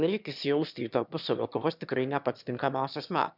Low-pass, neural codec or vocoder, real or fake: 5.4 kHz; autoencoder, 22.05 kHz, a latent of 192 numbers a frame, VITS, trained on one speaker; fake